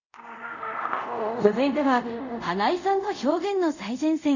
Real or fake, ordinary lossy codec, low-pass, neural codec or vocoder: fake; none; 7.2 kHz; codec, 24 kHz, 0.5 kbps, DualCodec